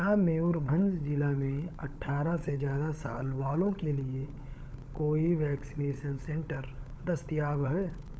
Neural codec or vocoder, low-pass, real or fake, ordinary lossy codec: codec, 16 kHz, 16 kbps, FunCodec, trained on LibriTTS, 50 frames a second; none; fake; none